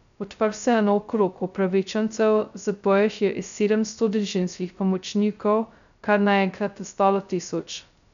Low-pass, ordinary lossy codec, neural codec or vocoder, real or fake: 7.2 kHz; none; codec, 16 kHz, 0.2 kbps, FocalCodec; fake